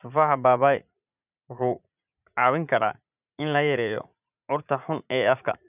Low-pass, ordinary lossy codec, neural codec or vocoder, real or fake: 3.6 kHz; none; vocoder, 44.1 kHz, 80 mel bands, Vocos; fake